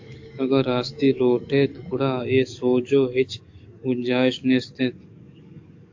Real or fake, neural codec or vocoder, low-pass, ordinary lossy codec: fake; codec, 44.1 kHz, 7.8 kbps, DAC; 7.2 kHz; AAC, 48 kbps